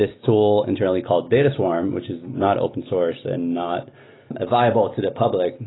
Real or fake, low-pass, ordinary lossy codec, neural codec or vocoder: real; 7.2 kHz; AAC, 16 kbps; none